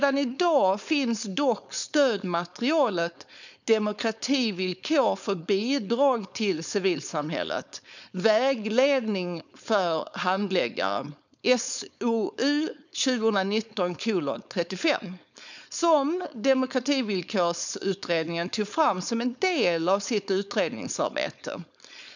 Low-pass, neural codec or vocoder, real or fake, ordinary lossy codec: 7.2 kHz; codec, 16 kHz, 4.8 kbps, FACodec; fake; none